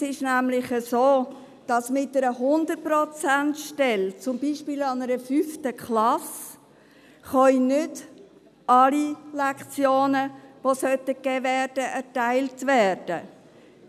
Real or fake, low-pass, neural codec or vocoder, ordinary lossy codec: real; 14.4 kHz; none; none